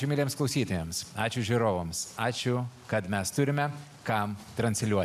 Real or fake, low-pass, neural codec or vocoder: real; 14.4 kHz; none